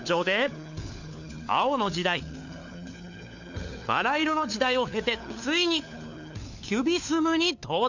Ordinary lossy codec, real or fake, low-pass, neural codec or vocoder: none; fake; 7.2 kHz; codec, 16 kHz, 4 kbps, FunCodec, trained on LibriTTS, 50 frames a second